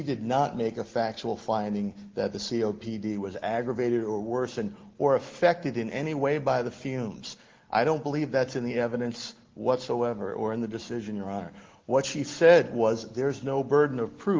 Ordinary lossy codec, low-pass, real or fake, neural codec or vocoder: Opus, 16 kbps; 7.2 kHz; real; none